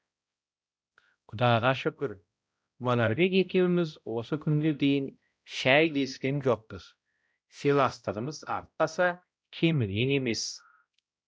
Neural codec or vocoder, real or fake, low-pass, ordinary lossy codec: codec, 16 kHz, 0.5 kbps, X-Codec, HuBERT features, trained on balanced general audio; fake; none; none